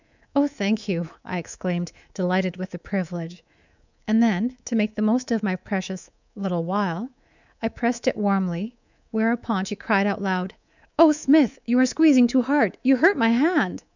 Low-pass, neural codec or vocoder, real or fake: 7.2 kHz; codec, 24 kHz, 3.1 kbps, DualCodec; fake